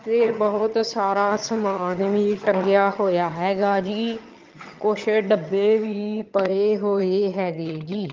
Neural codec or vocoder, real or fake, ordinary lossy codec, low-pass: vocoder, 22.05 kHz, 80 mel bands, HiFi-GAN; fake; Opus, 24 kbps; 7.2 kHz